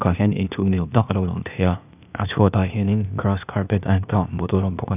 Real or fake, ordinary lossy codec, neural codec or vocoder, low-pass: fake; none; codec, 16 kHz, 0.8 kbps, ZipCodec; 3.6 kHz